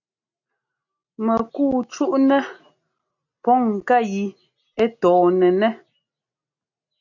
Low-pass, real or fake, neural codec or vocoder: 7.2 kHz; real; none